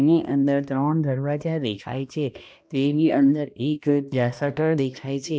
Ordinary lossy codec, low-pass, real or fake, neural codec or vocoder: none; none; fake; codec, 16 kHz, 1 kbps, X-Codec, HuBERT features, trained on balanced general audio